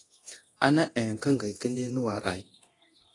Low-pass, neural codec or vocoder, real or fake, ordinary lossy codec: 10.8 kHz; codec, 24 kHz, 0.9 kbps, DualCodec; fake; AAC, 32 kbps